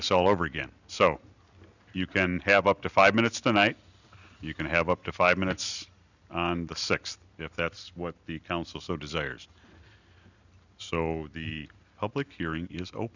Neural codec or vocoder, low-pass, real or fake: none; 7.2 kHz; real